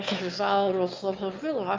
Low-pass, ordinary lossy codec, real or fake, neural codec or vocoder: 7.2 kHz; Opus, 24 kbps; fake; autoencoder, 22.05 kHz, a latent of 192 numbers a frame, VITS, trained on one speaker